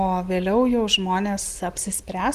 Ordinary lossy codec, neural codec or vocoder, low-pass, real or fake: Opus, 24 kbps; none; 14.4 kHz; real